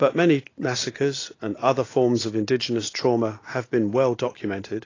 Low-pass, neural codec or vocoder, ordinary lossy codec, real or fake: 7.2 kHz; none; AAC, 32 kbps; real